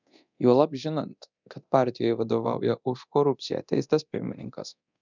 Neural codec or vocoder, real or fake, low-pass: codec, 24 kHz, 0.9 kbps, DualCodec; fake; 7.2 kHz